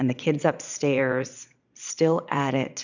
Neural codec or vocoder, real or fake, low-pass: vocoder, 22.05 kHz, 80 mel bands, Vocos; fake; 7.2 kHz